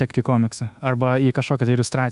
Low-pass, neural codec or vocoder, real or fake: 10.8 kHz; codec, 24 kHz, 1.2 kbps, DualCodec; fake